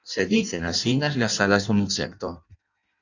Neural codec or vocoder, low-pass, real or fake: codec, 16 kHz in and 24 kHz out, 0.6 kbps, FireRedTTS-2 codec; 7.2 kHz; fake